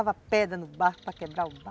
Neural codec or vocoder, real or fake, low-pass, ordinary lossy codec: none; real; none; none